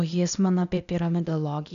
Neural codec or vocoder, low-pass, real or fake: codec, 16 kHz, 0.8 kbps, ZipCodec; 7.2 kHz; fake